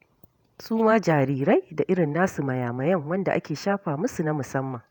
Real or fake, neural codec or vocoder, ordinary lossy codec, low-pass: fake; vocoder, 48 kHz, 128 mel bands, Vocos; none; 19.8 kHz